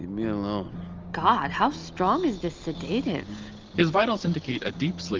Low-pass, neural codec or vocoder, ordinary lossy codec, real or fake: 7.2 kHz; none; Opus, 24 kbps; real